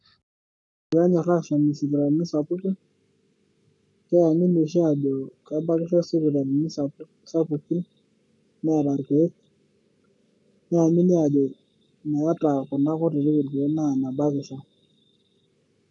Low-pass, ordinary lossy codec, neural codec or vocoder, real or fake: 10.8 kHz; none; codec, 44.1 kHz, 7.8 kbps, Pupu-Codec; fake